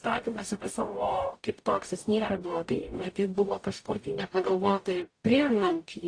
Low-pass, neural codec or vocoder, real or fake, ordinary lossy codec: 9.9 kHz; codec, 44.1 kHz, 0.9 kbps, DAC; fake; AAC, 48 kbps